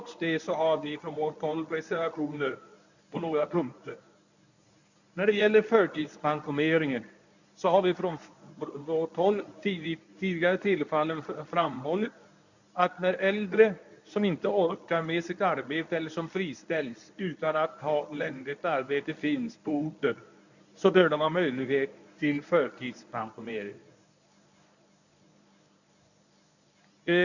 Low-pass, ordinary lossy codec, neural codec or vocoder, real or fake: 7.2 kHz; none; codec, 24 kHz, 0.9 kbps, WavTokenizer, medium speech release version 1; fake